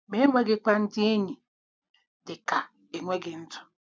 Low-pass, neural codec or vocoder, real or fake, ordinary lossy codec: none; none; real; none